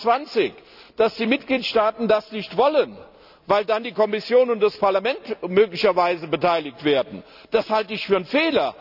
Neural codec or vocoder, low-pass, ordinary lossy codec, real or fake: none; 5.4 kHz; none; real